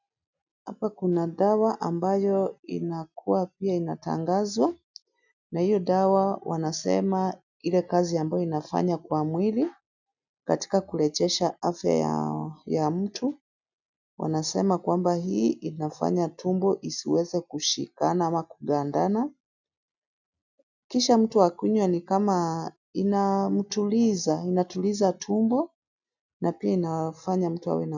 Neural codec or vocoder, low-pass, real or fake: none; 7.2 kHz; real